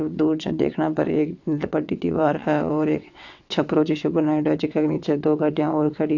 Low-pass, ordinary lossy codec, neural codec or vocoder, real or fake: 7.2 kHz; AAC, 48 kbps; vocoder, 22.05 kHz, 80 mel bands, WaveNeXt; fake